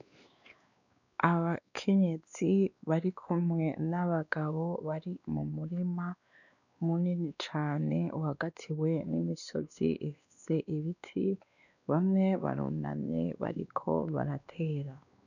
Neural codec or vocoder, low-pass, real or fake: codec, 16 kHz, 2 kbps, X-Codec, WavLM features, trained on Multilingual LibriSpeech; 7.2 kHz; fake